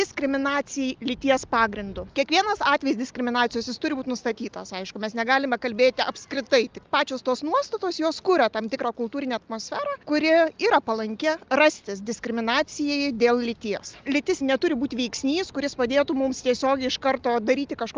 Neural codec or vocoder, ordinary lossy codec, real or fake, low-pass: none; Opus, 24 kbps; real; 7.2 kHz